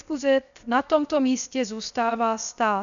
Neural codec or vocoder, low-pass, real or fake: codec, 16 kHz, 0.7 kbps, FocalCodec; 7.2 kHz; fake